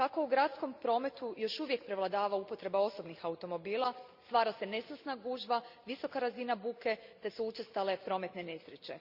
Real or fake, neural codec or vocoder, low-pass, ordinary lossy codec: real; none; 5.4 kHz; Opus, 64 kbps